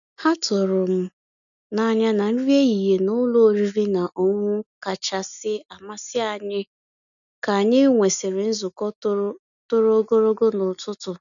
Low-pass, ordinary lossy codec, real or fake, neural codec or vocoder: 7.2 kHz; none; real; none